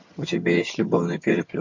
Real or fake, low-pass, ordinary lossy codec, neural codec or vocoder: fake; 7.2 kHz; MP3, 48 kbps; vocoder, 22.05 kHz, 80 mel bands, HiFi-GAN